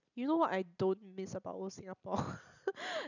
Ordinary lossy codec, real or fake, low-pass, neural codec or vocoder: none; fake; 7.2 kHz; vocoder, 44.1 kHz, 128 mel bands every 256 samples, BigVGAN v2